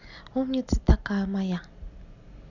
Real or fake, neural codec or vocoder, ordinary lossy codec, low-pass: real; none; none; 7.2 kHz